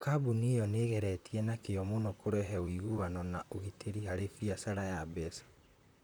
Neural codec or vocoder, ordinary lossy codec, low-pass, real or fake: vocoder, 44.1 kHz, 128 mel bands, Pupu-Vocoder; none; none; fake